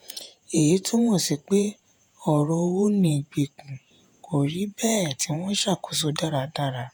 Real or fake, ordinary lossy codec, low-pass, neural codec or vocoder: fake; none; none; vocoder, 48 kHz, 128 mel bands, Vocos